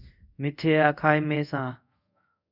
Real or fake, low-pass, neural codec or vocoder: fake; 5.4 kHz; codec, 24 kHz, 0.5 kbps, DualCodec